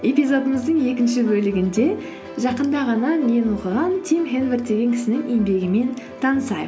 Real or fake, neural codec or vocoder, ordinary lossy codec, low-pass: real; none; none; none